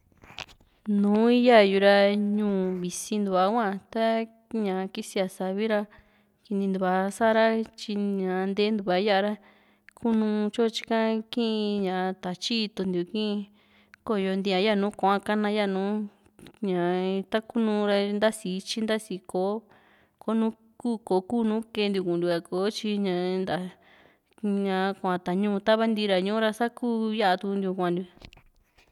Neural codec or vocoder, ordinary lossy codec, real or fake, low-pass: none; none; real; 19.8 kHz